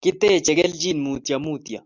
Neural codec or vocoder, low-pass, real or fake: none; 7.2 kHz; real